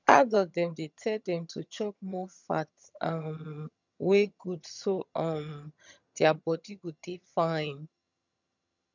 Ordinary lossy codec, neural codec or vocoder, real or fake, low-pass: none; vocoder, 22.05 kHz, 80 mel bands, HiFi-GAN; fake; 7.2 kHz